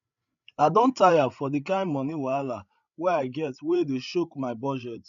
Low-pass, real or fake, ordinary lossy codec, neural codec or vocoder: 7.2 kHz; fake; none; codec, 16 kHz, 8 kbps, FreqCodec, larger model